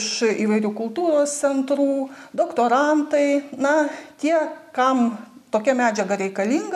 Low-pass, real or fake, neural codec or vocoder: 14.4 kHz; real; none